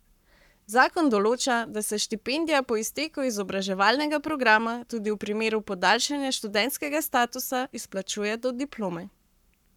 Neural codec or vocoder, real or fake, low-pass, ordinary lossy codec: codec, 44.1 kHz, 7.8 kbps, Pupu-Codec; fake; 19.8 kHz; none